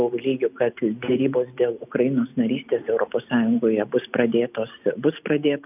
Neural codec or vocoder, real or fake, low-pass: none; real; 3.6 kHz